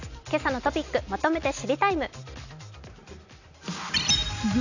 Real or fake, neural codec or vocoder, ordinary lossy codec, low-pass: real; none; none; 7.2 kHz